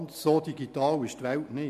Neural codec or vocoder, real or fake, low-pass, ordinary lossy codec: none; real; 14.4 kHz; MP3, 96 kbps